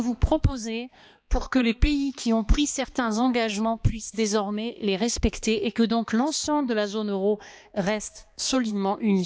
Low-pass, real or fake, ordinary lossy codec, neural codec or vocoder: none; fake; none; codec, 16 kHz, 2 kbps, X-Codec, HuBERT features, trained on balanced general audio